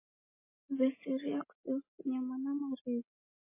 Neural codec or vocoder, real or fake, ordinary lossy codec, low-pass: none; real; MP3, 16 kbps; 3.6 kHz